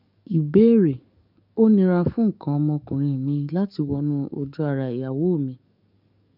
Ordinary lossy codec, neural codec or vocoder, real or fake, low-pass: none; codec, 44.1 kHz, 7.8 kbps, DAC; fake; 5.4 kHz